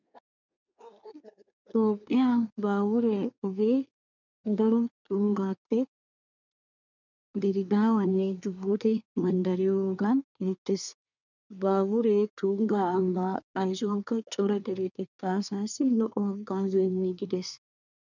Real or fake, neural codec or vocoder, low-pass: fake; codec, 24 kHz, 1 kbps, SNAC; 7.2 kHz